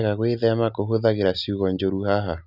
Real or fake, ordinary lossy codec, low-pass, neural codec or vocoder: real; none; 5.4 kHz; none